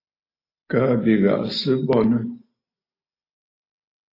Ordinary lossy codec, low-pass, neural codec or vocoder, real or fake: AAC, 32 kbps; 5.4 kHz; none; real